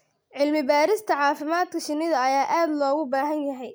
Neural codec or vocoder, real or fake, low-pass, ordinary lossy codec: none; real; none; none